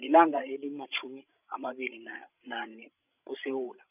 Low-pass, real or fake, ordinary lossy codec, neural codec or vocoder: 3.6 kHz; fake; none; codec, 16 kHz, 16 kbps, FreqCodec, larger model